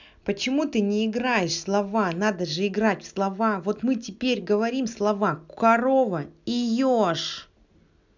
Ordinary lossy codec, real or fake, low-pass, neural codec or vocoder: none; real; 7.2 kHz; none